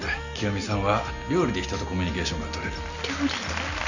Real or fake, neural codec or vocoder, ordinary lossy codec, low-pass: real; none; none; 7.2 kHz